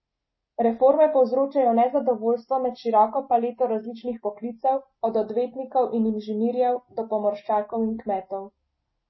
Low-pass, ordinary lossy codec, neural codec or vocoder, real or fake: 7.2 kHz; MP3, 24 kbps; none; real